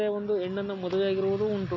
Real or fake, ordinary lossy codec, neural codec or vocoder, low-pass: real; none; none; 7.2 kHz